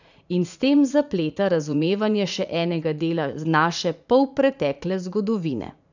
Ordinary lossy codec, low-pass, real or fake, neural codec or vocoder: none; 7.2 kHz; fake; vocoder, 44.1 kHz, 80 mel bands, Vocos